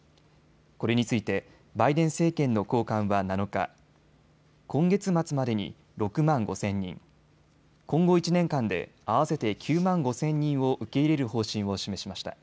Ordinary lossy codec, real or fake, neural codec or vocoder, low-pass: none; real; none; none